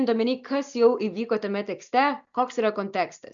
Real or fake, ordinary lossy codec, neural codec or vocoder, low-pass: real; MP3, 96 kbps; none; 7.2 kHz